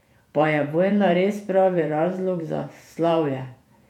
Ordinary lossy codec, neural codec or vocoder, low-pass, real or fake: none; vocoder, 48 kHz, 128 mel bands, Vocos; 19.8 kHz; fake